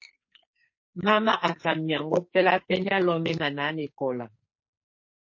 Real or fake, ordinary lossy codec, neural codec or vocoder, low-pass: fake; MP3, 32 kbps; codec, 44.1 kHz, 2.6 kbps, SNAC; 7.2 kHz